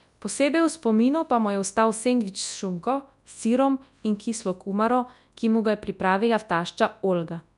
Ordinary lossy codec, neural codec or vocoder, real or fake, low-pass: none; codec, 24 kHz, 0.9 kbps, WavTokenizer, large speech release; fake; 10.8 kHz